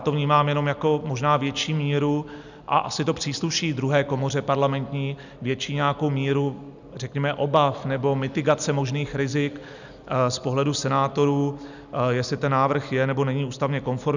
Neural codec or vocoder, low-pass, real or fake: none; 7.2 kHz; real